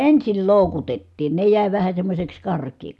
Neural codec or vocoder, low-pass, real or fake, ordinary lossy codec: none; none; real; none